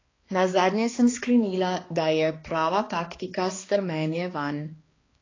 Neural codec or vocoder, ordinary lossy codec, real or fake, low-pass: codec, 16 kHz, 2 kbps, X-Codec, HuBERT features, trained on balanced general audio; AAC, 32 kbps; fake; 7.2 kHz